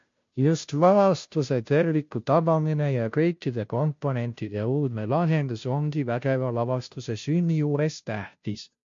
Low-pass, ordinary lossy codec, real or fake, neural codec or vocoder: 7.2 kHz; MP3, 48 kbps; fake; codec, 16 kHz, 0.5 kbps, FunCodec, trained on Chinese and English, 25 frames a second